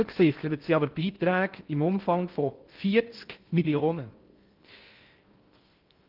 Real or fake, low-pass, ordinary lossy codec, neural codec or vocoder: fake; 5.4 kHz; Opus, 32 kbps; codec, 16 kHz in and 24 kHz out, 0.6 kbps, FocalCodec, streaming, 2048 codes